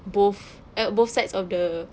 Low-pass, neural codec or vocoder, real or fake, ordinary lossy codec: none; none; real; none